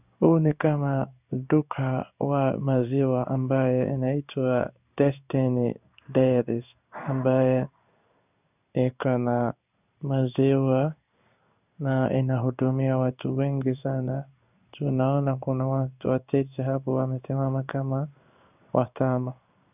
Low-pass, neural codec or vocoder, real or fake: 3.6 kHz; codec, 16 kHz in and 24 kHz out, 1 kbps, XY-Tokenizer; fake